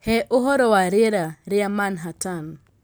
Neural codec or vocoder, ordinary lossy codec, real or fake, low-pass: none; none; real; none